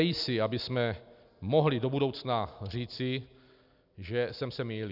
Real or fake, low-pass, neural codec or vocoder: real; 5.4 kHz; none